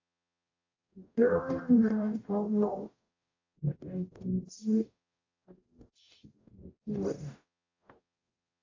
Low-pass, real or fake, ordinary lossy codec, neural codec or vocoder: 7.2 kHz; fake; AAC, 48 kbps; codec, 44.1 kHz, 0.9 kbps, DAC